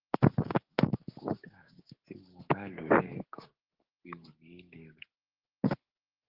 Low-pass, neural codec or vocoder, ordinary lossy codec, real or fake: 5.4 kHz; codec, 16 kHz, 6 kbps, DAC; Opus, 16 kbps; fake